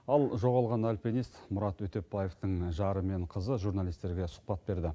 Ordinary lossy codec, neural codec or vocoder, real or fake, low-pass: none; none; real; none